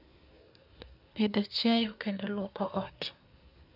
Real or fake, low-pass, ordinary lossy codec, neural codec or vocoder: fake; 5.4 kHz; none; codec, 24 kHz, 1 kbps, SNAC